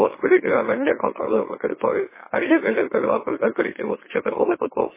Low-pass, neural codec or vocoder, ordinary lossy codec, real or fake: 3.6 kHz; autoencoder, 44.1 kHz, a latent of 192 numbers a frame, MeloTTS; MP3, 16 kbps; fake